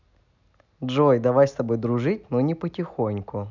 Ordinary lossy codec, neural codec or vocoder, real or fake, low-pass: none; none; real; 7.2 kHz